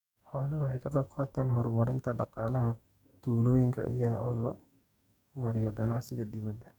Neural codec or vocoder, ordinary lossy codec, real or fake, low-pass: codec, 44.1 kHz, 2.6 kbps, DAC; none; fake; 19.8 kHz